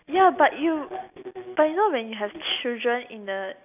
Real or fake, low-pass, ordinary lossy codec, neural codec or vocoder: real; 3.6 kHz; none; none